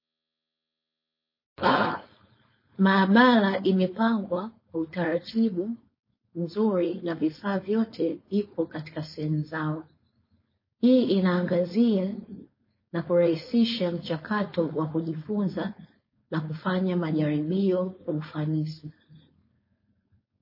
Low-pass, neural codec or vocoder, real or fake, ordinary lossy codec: 5.4 kHz; codec, 16 kHz, 4.8 kbps, FACodec; fake; MP3, 24 kbps